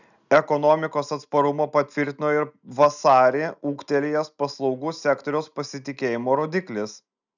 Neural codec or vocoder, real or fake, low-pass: none; real; 7.2 kHz